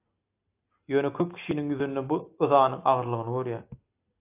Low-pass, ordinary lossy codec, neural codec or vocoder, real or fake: 3.6 kHz; AAC, 24 kbps; none; real